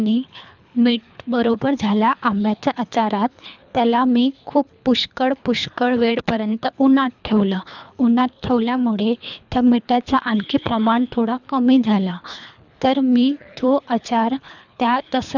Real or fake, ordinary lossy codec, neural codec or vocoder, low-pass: fake; none; codec, 24 kHz, 3 kbps, HILCodec; 7.2 kHz